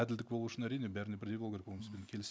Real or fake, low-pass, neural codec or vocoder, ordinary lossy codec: real; none; none; none